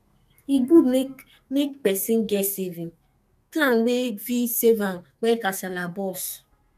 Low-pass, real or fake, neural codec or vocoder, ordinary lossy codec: 14.4 kHz; fake; codec, 44.1 kHz, 2.6 kbps, SNAC; none